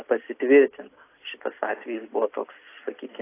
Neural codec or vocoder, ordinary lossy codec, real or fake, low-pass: none; AAC, 24 kbps; real; 3.6 kHz